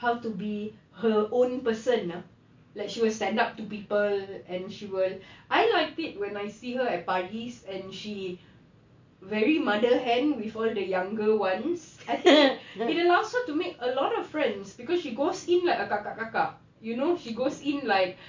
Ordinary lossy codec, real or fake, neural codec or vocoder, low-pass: MP3, 48 kbps; real; none; 7.2 kHz